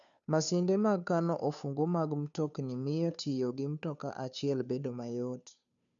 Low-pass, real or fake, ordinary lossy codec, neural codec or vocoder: 7.2 kHz; fake; none; codec, 16 kHz, 2 kbps, FunCodec, trained on Chinese and English, 25 frames a second